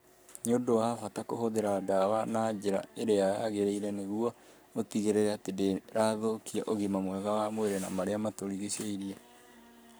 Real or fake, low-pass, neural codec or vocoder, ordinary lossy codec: fake; none; codec, 44.1 kHz, 7.8 kbps, Pupu-Codec; none